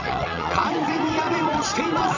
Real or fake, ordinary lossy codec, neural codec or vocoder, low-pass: fake; none; vocoder, 22.05 kHz, 80 mel bands, WaveNeXt; 7.2 kHz